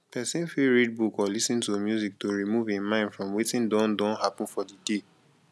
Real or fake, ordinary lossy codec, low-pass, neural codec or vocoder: real; none; none; none